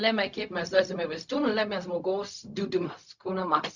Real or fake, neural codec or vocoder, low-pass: fake; codec, 16 kHz, 0.4 kbps, LongCat-Audio-Codec; 7.2 kHz